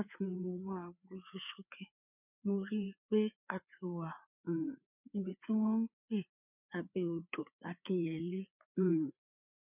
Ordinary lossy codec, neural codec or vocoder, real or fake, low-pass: none; codec, 16 kHz, 4 kbps, FreqCodec, larger model; fake; 3.6 kHz